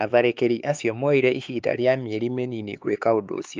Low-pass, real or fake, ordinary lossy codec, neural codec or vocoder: 7.2 kHz; fake; Opus, 24 kbps; codec, 16 kHz, 4 kbps, X-Codec, HuBERT features, trained on LibriSpeech